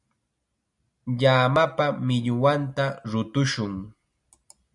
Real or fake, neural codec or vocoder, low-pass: real; none; 10.8 kHz